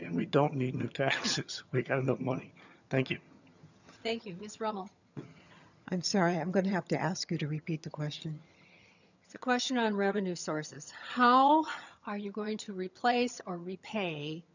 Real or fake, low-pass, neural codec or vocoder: fake; 7.2 kHz; vocoder, 22.05 kHz, 80 mel bands, HiFi-GAN